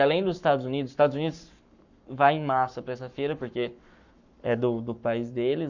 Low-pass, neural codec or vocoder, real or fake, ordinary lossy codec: 7.2 kHz; codec, 44.1 kHz, 7.8 kbps, Pupu-Codec; fake; none